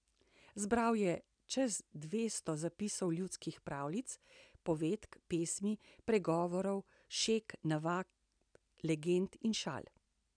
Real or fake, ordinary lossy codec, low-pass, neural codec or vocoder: real; none; 9.9 kHz; none